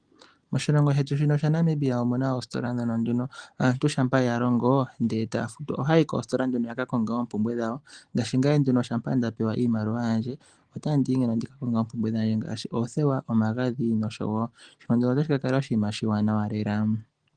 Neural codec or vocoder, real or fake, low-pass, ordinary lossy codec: none; real; 9.9 kHz; Opus, 24 kbps